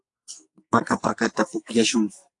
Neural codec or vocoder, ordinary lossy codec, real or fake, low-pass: codec, 44.1 kHz, 2.6 kbps, SNAC; AAC, 64 kbps; fake; 10.8 kHz